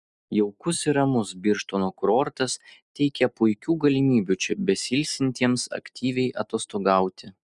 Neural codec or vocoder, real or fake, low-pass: none; real; 10.8 kHz